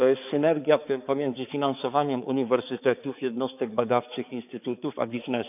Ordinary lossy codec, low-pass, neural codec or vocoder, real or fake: none; 3.6 kHz; codec, 16 kHz, 4 kbps, X-Codec, HuBERT features, trained on balanced general audio; fake